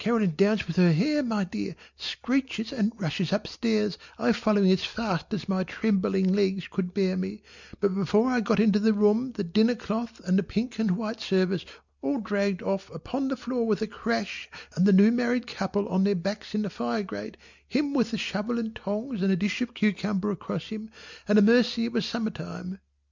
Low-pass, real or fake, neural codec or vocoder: 7.2 kHz; real; none